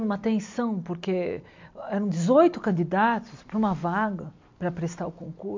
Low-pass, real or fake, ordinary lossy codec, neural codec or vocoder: 7.2 kHz; real; MP3, 64 kbps; none